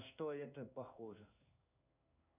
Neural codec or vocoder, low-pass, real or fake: codec, 16 kHz in and 24 kHz out, 1 kbps, XY-Tokenizer; 3.6 kHz; fake